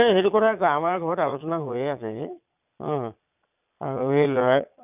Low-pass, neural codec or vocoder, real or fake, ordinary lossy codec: 3.6 kHz; vocoder, 22.05 kHz, 80 mel bands, Vocos; fake; none